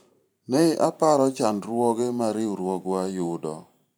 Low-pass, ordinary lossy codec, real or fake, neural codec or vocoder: none; none; fake; vocoder, 44.1 kHz, 128 mel bands every 512 samples, BigVGAN v2